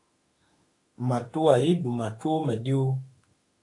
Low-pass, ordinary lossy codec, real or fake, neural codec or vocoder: 10.8 kHz; AAC, 48 kbps; fake; autoencoder, 48 kHz, 32 numbers a frame, DAC-VAE, trained on Japanese speech